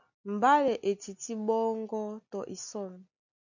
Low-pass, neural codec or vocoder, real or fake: 7.2 kHz; none; real